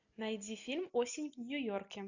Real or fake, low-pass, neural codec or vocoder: real; 7.2 kHz; none